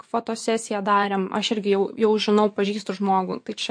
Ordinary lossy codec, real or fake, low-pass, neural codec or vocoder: MP3, 48 kbps; fake; 9.9 kHz; vocoder, 24 kHz, 100 mel bands, Vocos